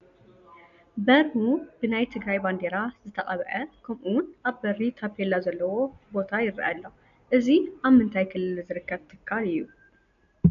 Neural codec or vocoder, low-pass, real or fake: none; 7.2 kHz; real